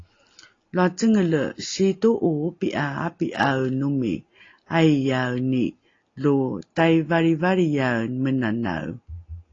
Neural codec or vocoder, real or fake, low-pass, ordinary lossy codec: none; real; 7.2 kHz; AAC, 32 kbps